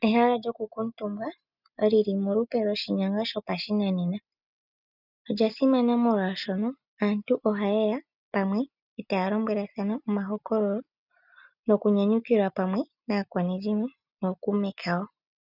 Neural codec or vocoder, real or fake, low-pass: none; real; 5.4 kHz